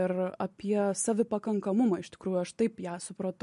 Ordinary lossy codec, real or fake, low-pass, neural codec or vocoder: MP3, 48 kbps; real; 14.4 kHz; none